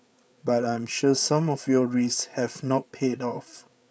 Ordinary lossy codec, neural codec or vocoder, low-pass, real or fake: none; codec, 16 kHz, 8 kbps, FreqCodec, larger model; none; fake